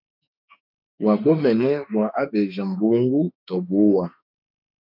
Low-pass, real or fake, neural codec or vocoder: 5.4 kHz; fake; autoencoder, 48 kHz, 32 numbers a frame, DAC-VAE, trained on Japanese speech